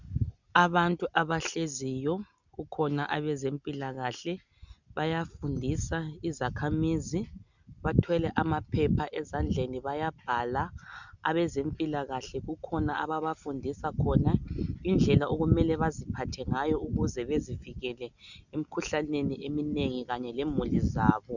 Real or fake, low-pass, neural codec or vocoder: real; 7.2 kHz; none